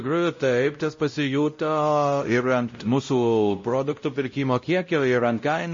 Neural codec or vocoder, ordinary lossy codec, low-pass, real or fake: codec, 16 kHz, 0.5 kbps, X-Codec, WavLM features, trained on Multilingual LibriSpeech; MP3, 32 kbps; 7.2 kHz; fake